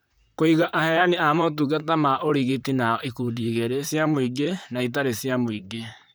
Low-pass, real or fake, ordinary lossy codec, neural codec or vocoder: none; fake; none; vocoder, 44.1 kHz, 128 mel bands, Pupu-Vocoder